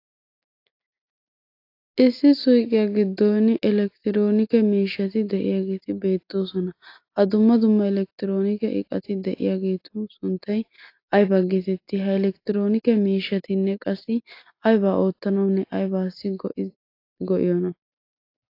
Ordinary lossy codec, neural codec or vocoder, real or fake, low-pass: AAC, 32 kbps; none; real; 5.4 kHz